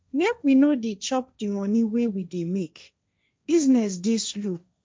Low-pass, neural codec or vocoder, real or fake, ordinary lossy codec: none; codec, 16 kHz, 1.1 kbps, Voila-Tokenizer; fake; none